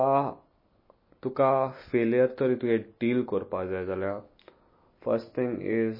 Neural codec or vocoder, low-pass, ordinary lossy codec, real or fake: none; 5.4 kHz; MP3, 24 kbps; real